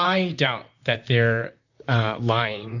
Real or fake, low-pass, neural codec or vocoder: fake; 7.2 kHz; vocoder, 44.1 kHz, 128 mel bands, Pupu-Vocoder